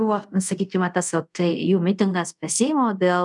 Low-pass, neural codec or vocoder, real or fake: 10.8 kHz; codec, 24 kHz, 0.5 kbps, DualCodec; fake